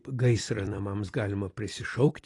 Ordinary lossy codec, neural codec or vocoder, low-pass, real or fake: AAC, 32 kbps; vocoder, 44.1 kHz, 128 mel bands every 256 samples, BigVGAN v2; 10.8 kHz; fake